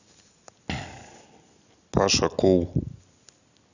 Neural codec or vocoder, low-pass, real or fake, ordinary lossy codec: none; 7.2 kHz; real; none